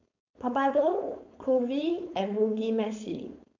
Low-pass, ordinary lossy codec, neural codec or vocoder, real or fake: 7.2 kHz; none; codec, 16 kHz, 4.8 kbps, FACodec; fake